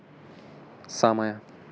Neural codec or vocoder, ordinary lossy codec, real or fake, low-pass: none; none; real; none